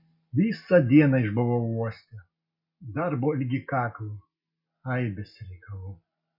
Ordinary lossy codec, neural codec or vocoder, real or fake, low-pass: MP3, 32 kbps; none; real; 5.4 kHz